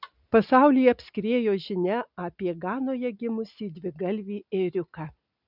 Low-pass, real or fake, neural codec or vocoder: 5.4 kHz; real; none